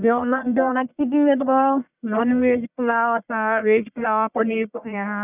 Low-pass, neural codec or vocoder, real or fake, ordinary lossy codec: 3.6 kHz; codec, 44.1 kHz, 1.7 kbps, Pupu-Codec; fake; none